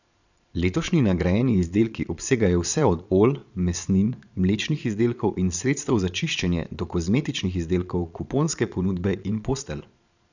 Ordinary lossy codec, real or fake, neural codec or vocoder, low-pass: none; fake; vocoder, 22.05 kHz, 80 mel bands, Vocos; 7.2 kHz